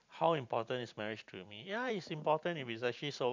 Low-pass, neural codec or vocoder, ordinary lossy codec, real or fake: 7.2 kHz; vocoder, 44.1 kHz, 128 mel bands every 512 samples, BigVGAN v2; MP3, 64 kbps; fake